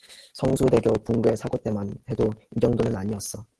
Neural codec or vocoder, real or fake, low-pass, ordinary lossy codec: none; real; 10.8 kHz; Opus, 16 kbps